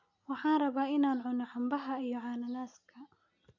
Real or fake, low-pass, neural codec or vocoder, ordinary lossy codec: real; 7.2 kHz; none; AAC, 48 kbps